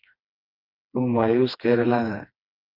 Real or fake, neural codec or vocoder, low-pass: fake; codec, 16 kHz, 2 kbps, FreqCodec, smaller model; 5.4 kHz